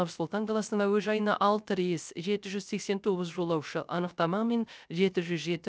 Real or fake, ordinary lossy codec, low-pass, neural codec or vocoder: fake; none; none; codec, 16 kHz, 0.3 kbps, FocalCodec